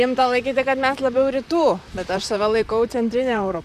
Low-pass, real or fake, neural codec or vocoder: 14.4 kHz; fake; vocoder, 44.1 kHz, 128 mel bands, Pupu-Vocoder